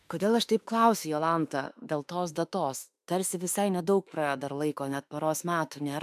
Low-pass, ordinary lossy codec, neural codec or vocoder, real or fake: 14.4 kHz; AAC, 96 kbps; autoencoder, 48 kHz, 32 numbers a frame, DAC-VAE, trained on Japanese speech; fake